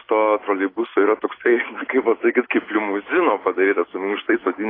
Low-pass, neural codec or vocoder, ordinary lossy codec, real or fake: 5.4 kHz; none; AAC, 24 kbps; real